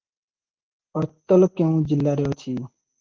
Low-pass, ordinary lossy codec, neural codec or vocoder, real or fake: 7.2 kHz; Opus, 16 kbps; none; real